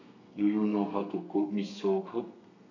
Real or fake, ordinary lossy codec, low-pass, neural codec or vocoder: fake; none; 7.2 kHz; codec, 32 kHz, 1.9 kbps, SNAC